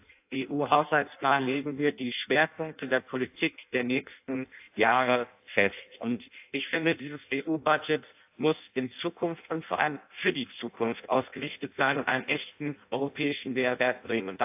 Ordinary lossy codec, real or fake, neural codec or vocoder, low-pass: AAC, 32 kbps; fake; codec, 16 kHz in and 24 kHz out, 0.6 kbps, FireRedTTS-2 codec; 3.6 kHz